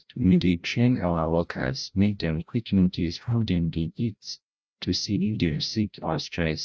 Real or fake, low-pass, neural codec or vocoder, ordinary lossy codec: fake; none; codec, 16 kHz, 0.5 kbps, FreqCodec, larger model; none